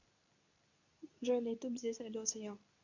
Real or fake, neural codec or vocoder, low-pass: fake; codec, 24 kHz, 0.9 kbps, WavTokenizer, medium speech release version 2; 7.2 kHz